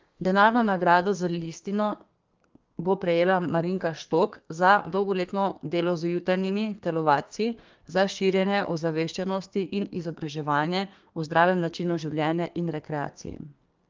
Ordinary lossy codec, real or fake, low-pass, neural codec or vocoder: Opus, 32 kbps; fake; 7.2 kHz; codec, 32 kHz, 1.9 kbps, SNAC